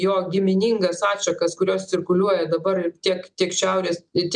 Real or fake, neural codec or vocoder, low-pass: real; none; 9.9 kHz